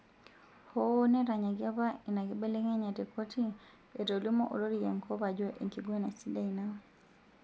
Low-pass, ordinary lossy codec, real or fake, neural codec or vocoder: none; none; real; none